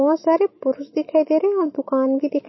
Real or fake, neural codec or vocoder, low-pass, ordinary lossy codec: fake; autoencoder, 48 kHz, 128 numbers a frame, DAC-VAE, trained on Japanese speech; 7.2 kHz; MP3, 24 kbps